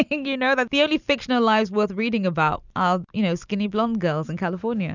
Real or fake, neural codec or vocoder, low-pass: real; none; 7.2 kHz